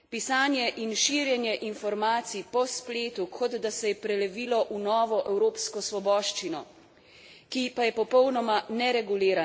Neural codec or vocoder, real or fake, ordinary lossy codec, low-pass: none; real; none; none